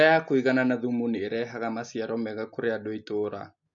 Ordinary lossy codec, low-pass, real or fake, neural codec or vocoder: MP3, 48 kbps; 7.2 kHz; real; none